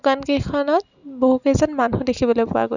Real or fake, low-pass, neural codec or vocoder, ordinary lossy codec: real; 7.2 kHz; none; none